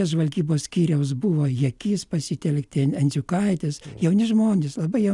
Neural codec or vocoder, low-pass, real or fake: none; 10.8 kHz; real